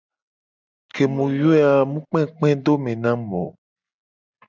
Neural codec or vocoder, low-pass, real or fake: none; 7.2 kHz; real